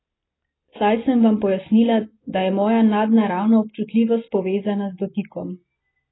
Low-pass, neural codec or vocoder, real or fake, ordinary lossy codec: 7.2 kHz; none; real; AAC, 16 kbps